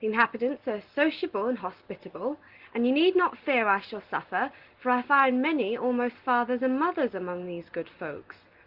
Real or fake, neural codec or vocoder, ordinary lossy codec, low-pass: real; none; Opus, 16 kbps; 5.4 kHz